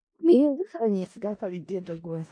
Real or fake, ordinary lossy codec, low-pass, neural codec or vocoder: fake; MP3, 64 kbps; 9.9 kHz; codec, 16 kHz in and 24 kHz out, 0.4 kbps, LongCat-Audio-Codec, four codebook decoder